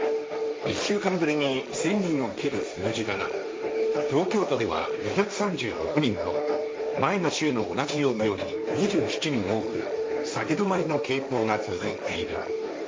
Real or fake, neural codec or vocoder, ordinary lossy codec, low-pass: fake; codec, 16 kHz, 1.1 kbps, Voila-Tokenizer; none; none